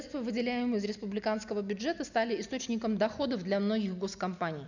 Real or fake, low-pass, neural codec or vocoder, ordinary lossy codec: real; 7.2 kHz; none; none